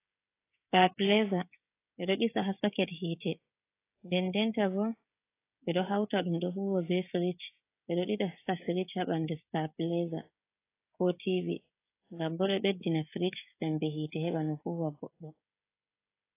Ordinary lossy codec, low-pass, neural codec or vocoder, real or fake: AAC, 24 kbps; 3.6 kHz; codec, 16 kHz, 16 kbps, FreqCodec, smaller model; fake